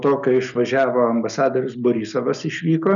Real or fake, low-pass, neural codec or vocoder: real; 7.2 kHz; none